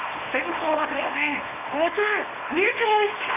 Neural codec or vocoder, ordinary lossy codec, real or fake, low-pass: codec, 24 kHz, 0.9 kbps, WavTokenizer, small release; none; fake; 3.6 kHz